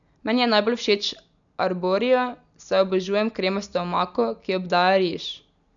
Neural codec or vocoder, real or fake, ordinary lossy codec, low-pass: none; real; none; 7.2 kHz